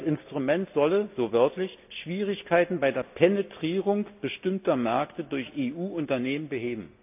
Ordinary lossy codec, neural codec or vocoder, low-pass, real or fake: AAC, 32 kbps; none; 3.6 kHz; real